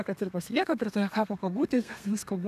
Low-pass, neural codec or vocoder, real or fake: 14.4 kHz; codec, 32 kHz, 1.9 kbps, SNAC; fake